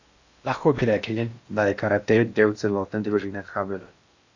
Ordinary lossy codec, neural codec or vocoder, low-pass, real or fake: AAC, 48 kbps; codec, 16 kHz in and 24 kHz out, 0.6 kbps, FocalCodec, streaming, 4096 codes; 7.2 kHz; fake